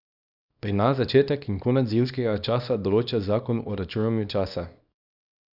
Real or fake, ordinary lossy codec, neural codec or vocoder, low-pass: fake; none; codec, 24 kHz, 0.9 kbps, WavTokenizer, small release; 5.4 kHz